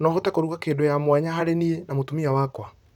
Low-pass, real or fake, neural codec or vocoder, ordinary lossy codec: 19.8 kHz; fake; vocoder, 44.1 kHz, 128 mel bands, Pupu-Vocoder; none